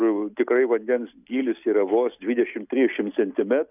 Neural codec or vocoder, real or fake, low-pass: none; real; 3.6 kHz